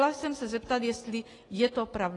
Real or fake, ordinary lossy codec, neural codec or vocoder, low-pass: real; AAC, 32 kbps; none; 10.8 kHz